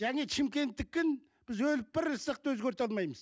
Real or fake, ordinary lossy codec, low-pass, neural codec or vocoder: real; none; none; none